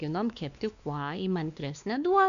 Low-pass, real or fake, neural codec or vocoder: 7.2 kHz; fake; codec, 16 kHz, 2 kbps, X-Codec, WavLM features, trained on Multilingual LibriSpeech